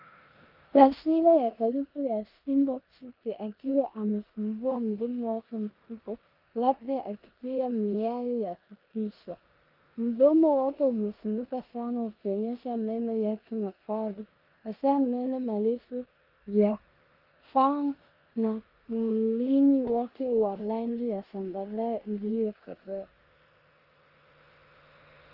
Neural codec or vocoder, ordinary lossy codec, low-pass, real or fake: codec, 16 kHz in and 24 kHz out, 0.9 kbps, LongCat-Audio-Codec, four codebook decoder; Opus, 24 kbps; 5.4 kHz; fake